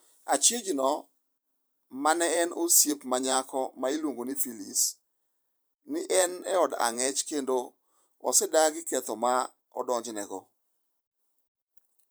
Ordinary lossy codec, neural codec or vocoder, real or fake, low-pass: none; vocoder, 44.1 kHz, 128 mel bands every 512 samples, BigVGAN v2; fake; none